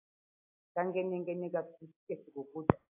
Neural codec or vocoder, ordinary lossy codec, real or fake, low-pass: none; AAC, 32 kbps; real; 3.6 kHz